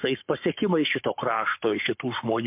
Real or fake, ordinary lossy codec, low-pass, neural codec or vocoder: fake; MP3, 32 kbps; 3.6 kHz; codec, 44.1 kHz, 7.8 kbps, Pupu-Codec